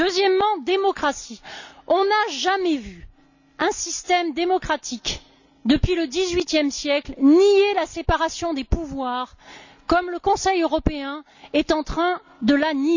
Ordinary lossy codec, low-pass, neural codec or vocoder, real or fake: none; 7.2 kHz; none; real